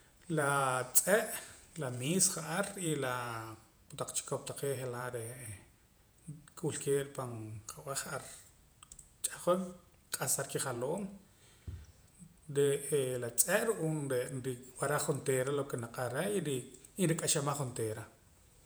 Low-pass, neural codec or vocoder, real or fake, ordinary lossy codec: none; vocoder, 48 kHz, 128 mel bands, Vocos; fake; none